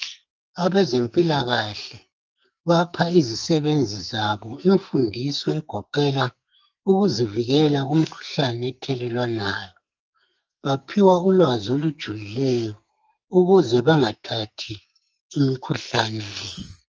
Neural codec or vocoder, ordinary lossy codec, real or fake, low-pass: codec, 44.1 kHz, 2.6 kbps, SNAC; Opus, 24 kbps; fake; 7.2 kHz